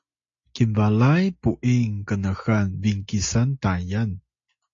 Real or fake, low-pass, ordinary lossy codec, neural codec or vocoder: real; 7.2 kHz; AAC, 48 kbps; none